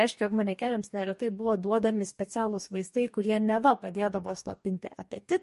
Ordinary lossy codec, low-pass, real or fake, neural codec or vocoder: MP3, 48 kbps; 14.4 kHz; fake; codec, 44.1 kHz, 2.6 kbps, DAC